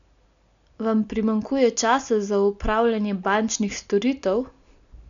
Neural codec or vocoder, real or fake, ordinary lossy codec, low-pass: none; real; none; 7.2 kHz